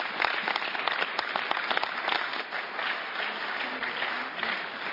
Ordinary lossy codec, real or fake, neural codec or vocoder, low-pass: AAC, 48 kbps; real; none; 5.4 kHz